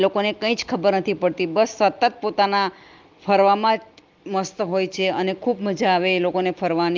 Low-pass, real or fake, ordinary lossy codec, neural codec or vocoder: 7.2 kHz; real; Opus, 32 kbps; none